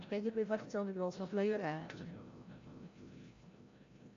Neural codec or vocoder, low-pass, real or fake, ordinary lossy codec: codec, 16 kHz, 0.5 kbps, FreqCodec, larger model; 7.2 kHz; fake; AAC, 48 kbps